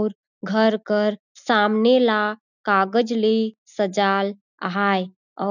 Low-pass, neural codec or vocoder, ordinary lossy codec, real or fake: 7.2 kHz; none; none; real